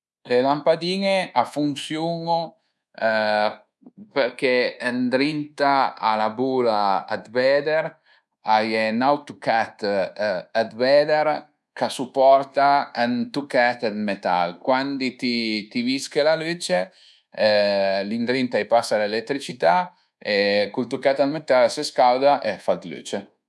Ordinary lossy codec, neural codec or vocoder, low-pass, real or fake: none; codec, 24 kHz, 1.2 kbps, DualCodec; none; fake